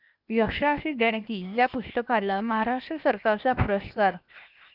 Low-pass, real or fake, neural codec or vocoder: 5.4 kHz; fake; codec, 16 kHz, 0.8 kbps, ZipCodec